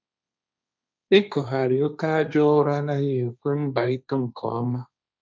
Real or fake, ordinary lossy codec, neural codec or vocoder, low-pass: fake; none; codec, 16 kHz, 1.1 kbps, Voila-Tokenizer; none